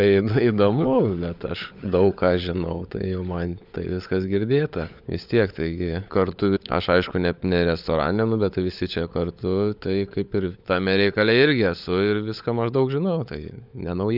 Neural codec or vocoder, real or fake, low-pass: codec, 16 kHz, 16 kbps, FunCodec, trained on LibriTTS, 50 frames a second; fake; 5.4 kHz